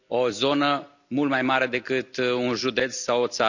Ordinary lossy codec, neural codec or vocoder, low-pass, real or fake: none; none; 7.2 kHz; real